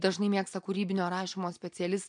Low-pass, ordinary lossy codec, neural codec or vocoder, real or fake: 9.9 kHz; MP3, 64 kbps; none; real